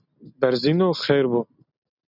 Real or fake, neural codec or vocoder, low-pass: real; none; 5.4 kHz